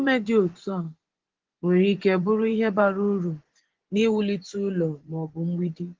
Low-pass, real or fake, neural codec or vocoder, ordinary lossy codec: 7.2 kHz; fake; vocoder, 24 kHz, 100 mel bands, Vocos; Opus, 16 kbps